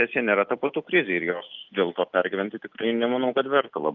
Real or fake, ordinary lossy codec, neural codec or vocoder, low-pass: real; Opus, 32 kbps; none; 7.2 kHz